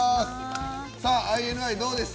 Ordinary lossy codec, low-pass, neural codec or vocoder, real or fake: none; none; none; real